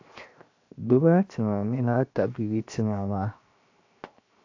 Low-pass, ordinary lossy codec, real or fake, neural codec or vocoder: 7.2 kHz; AAC, 48 kbps; fake; codec, 16 kHz, 0.7 kbps, FocalCodec